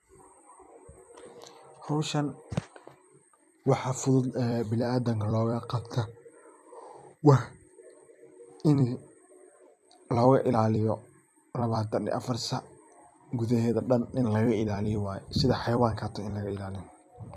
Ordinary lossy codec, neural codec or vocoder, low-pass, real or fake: none; vocoder, 44.1 kHz, 128 mel bands every 256 samples, BigVGAN v2; 14.4 kHz; fake